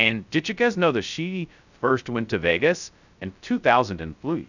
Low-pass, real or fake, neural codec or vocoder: 7.2 kHz; fake; codec, 16 kHz, 0.2 kbps, FocalCodec